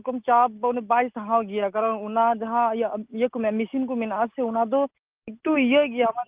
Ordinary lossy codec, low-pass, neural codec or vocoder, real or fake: Opus, 32 kbps; 3.6 kHz; none; real